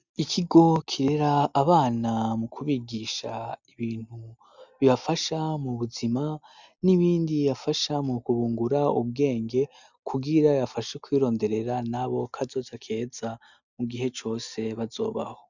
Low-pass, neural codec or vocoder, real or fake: 7.2 kHz; none; real